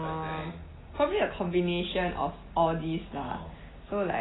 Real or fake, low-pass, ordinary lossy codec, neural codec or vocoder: real; 7.2 kHz; AAC, 16 kbps; none